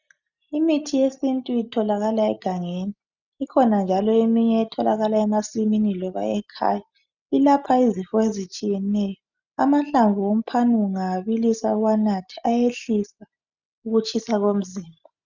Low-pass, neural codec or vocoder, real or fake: 7.2 kHz; none; real